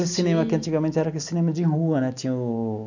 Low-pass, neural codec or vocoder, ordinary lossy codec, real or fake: 7.2 kHz; none; none; real